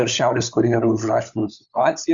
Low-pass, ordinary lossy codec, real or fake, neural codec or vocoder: 7.2 kHz; Opus, 64 kbps; fake; codec, 16 kHz, 16 kbps, FunCodec, trained on LibriTTS, 50 frames a second